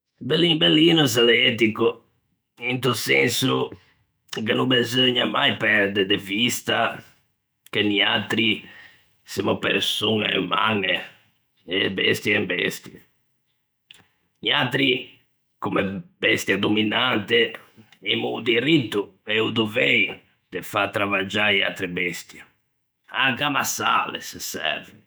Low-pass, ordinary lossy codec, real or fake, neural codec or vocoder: none; none; fake; autoencoder, 48 kHz, 128 numbers a frame, DAC-VAE, trained on Japanese speech